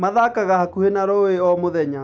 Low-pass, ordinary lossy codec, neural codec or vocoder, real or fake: none; none; none; real